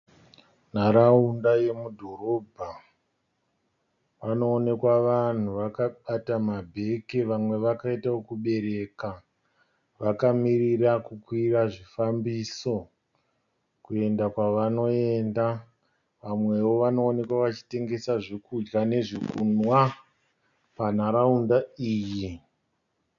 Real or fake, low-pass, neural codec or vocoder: real; 7.2 kHz; none